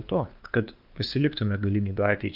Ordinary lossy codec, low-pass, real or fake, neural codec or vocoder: Opus, 24 kbps; 5.4 kHz; fake; codec, 16 kHz, 2 kbps, FunCodec, trained on LibriTTS, 25 frames a second